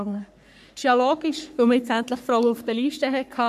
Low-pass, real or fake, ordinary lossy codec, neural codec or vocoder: 14.4 kHz; fake; none; codec, 44.1 kHz, 3.4 kbps, Pupu-Codec